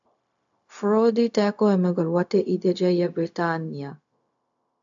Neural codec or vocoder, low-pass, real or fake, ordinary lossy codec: codec, 16 kHz, 0.4 kbps, LongCat-Audio-Codec; 7.2 kHz; fake; AAC, 64 kbps